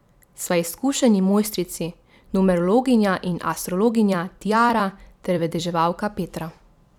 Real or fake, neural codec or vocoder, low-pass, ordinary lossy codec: fake; vocoder, 44.1 kHz, 128 mel bands every 256 samples, BigVGAN v2; 19.8 kHz; none